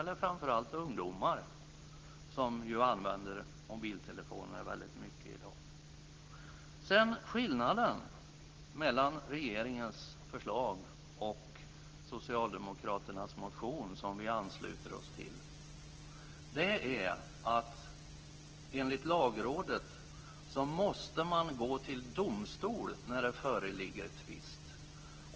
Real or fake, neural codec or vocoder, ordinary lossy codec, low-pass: real; none; Opus, 16 kbps; 7.2 kHz